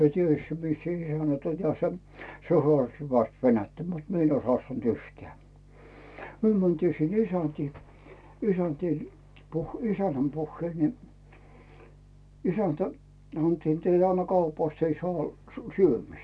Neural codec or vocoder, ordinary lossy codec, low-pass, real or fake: none; none; none; real